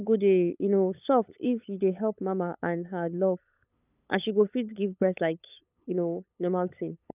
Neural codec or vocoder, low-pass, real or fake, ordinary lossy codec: codec, 16 kHz, 8 kbps, FunCodec, trained on LibriTTS, 25 frames a second; 3.6 kHz; fake; none